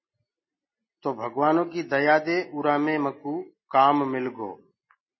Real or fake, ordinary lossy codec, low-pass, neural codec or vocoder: real; MP3, 24 kbps; 7.2 kHz; none